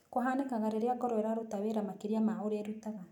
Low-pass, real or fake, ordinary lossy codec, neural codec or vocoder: 19.8 kHz; real; none; none